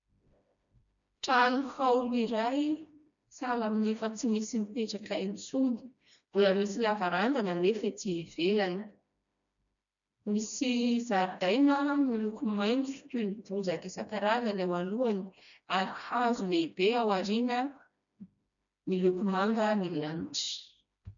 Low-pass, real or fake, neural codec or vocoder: 7.2 kHz; fake; codec, 16 kHz, 1 kbps, FreqCodec, smaller model